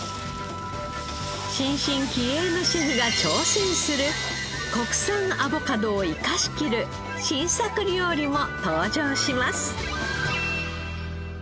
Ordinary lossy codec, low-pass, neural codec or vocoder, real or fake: none; none; none; real